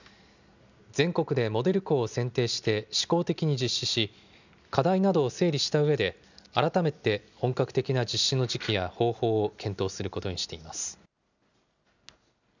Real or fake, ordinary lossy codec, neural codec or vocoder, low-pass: real; none; none; 7.2 kHz